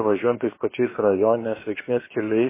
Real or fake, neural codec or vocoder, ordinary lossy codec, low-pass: fake; codec, 16 kHz, about 1 kbps, DyCAST, with the encoder's durations; MP3, 16 kbps; 3.6 kHz